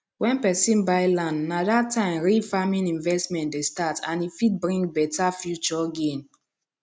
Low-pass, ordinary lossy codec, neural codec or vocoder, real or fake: none; none; none; real